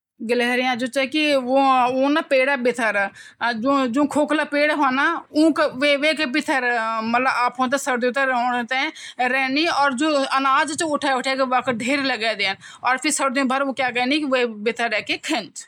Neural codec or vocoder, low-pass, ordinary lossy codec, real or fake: none; 19.8 kHz; none; real